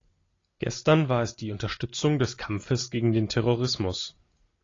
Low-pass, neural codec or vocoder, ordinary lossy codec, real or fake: 7.2 kHz; none; AAC, 32 kbps; real